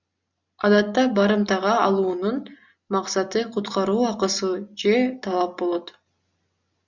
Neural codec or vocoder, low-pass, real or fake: none; 7.2 kHz; real